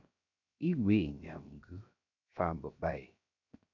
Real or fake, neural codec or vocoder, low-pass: fake; codec, 16 kHz, 0.7 kbps, FocalCodec; 7.2 kHz